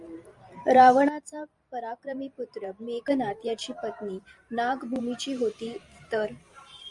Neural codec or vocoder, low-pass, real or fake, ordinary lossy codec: vocoder, 44.1 kHz, 128 mel bands every 512 samples, BigVGAN v2; 10.8 kHz; fake; MP3, 96 kbps